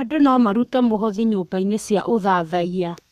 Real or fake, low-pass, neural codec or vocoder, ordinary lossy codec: fake; 14.4 kHz; codec, 32 kHz, 1.9 kbps, SNAC; none